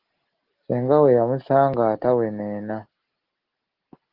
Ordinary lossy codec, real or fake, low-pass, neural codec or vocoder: Opus, 16 kbps; real; 5.4 kHz; none